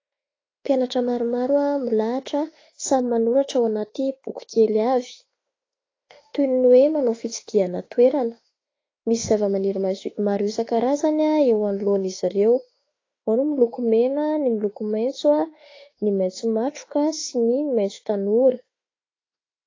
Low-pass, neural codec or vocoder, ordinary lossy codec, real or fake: 7.2 kHz; autoencoder, 48 kHz, 32 numbers a frame, DAC-VAE, trained on Japanese speech; AAC, 32 kbps; fake